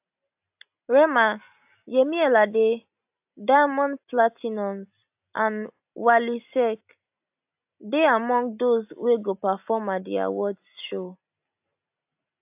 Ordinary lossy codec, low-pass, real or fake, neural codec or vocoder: AAC, 32 kbps; 3.6 kHz; real; none